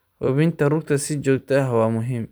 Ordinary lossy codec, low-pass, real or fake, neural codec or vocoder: none; none; fake; vocoder, 44.1 kHz, 128 mel bands every 256 samples, BigVGAN v2